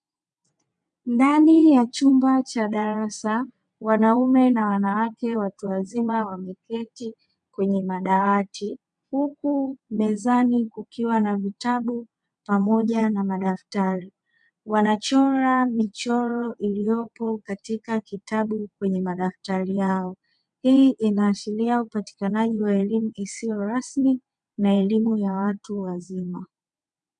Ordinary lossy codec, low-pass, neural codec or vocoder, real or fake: MP3, 96 kbps; 9.9 kHz; vocoder, 22.05 kHz, 80 mel bands, WaveNeXt; fake